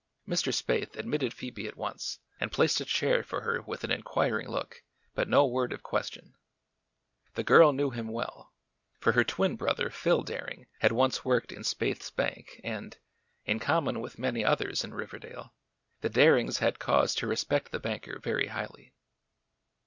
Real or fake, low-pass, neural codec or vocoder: real; 7.2 kHz; none